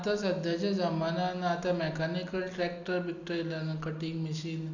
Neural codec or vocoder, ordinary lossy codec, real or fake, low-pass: none; none; real; 7.2 kHz